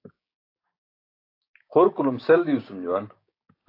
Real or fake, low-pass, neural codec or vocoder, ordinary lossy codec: fake; 5.4 kHz; codec, 16 kHz, 6 kbps, DAC; AAC, 32 kbps